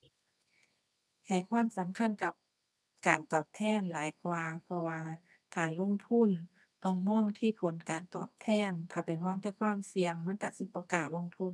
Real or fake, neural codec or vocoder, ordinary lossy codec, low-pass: fake; codec, 24 kHz, 0.9 kbps, WavTokenizer, medium music audio release; none; none